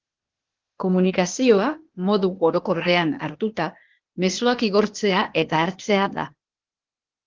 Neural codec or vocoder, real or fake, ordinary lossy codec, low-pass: codec, 16 kHz, 0.8 kbps, ZipCodec; fake; Opus, 16 kbps; 7.2 kHz